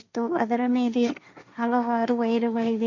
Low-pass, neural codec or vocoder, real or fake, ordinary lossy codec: 7.2 kHz; codec, 16 kHz, 1.1 kbps, Voila-Tokenizer; fake; none